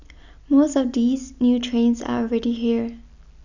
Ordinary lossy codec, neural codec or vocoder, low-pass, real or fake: none; none; 7.2 kHz; real